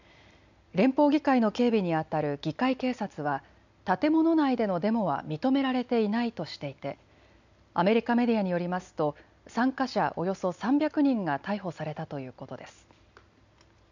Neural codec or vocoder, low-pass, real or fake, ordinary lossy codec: none; 7.2 kHz; real; none